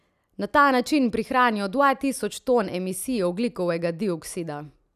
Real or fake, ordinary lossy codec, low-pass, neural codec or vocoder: real; none; 14.4 kHz; none